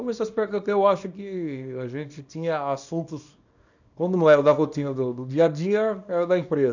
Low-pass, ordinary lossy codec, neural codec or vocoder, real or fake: 7.2 kHz; none; codec, 24 kHz, 0.9 kbps, WavTokenizer, small release; fake